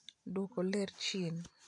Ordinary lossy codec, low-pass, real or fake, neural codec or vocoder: none; 10.8 kHz; real; none